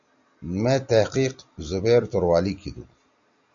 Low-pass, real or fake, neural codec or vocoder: 7.2 kHz; real; none